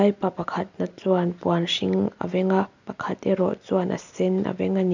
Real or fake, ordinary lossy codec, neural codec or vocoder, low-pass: real; none; none; 7.2 kHz